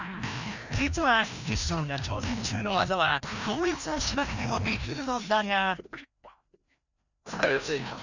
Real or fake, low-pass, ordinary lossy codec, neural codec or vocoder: fake; 7.2 kHz; none; codec, 16 kHz, 1 kbps, FreqCodec, larger model